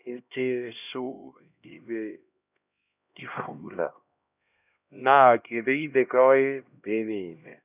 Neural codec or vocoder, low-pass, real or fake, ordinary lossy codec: codec, 16 kHz, 1 kbps, X-Codec, HuBERT features, trained on LibriSpeech; 3.6 kHz; fake; none